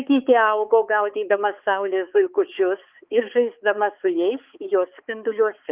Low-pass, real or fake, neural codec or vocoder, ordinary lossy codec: 3.6 kHz; fake; codec, 16 kHz, 4 kbps, X-Codec, HuBERT features, trained on balanced general audio; Opus, 32 kbps